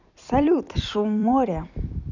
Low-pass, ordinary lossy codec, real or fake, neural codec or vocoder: 7.2 kHz; none; fake; vocoder, 44.1 kHz, 128 mel bands every 256 samples, BigVGAN v2